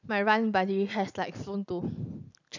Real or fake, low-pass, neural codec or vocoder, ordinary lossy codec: real; 7.2 kHz; none; none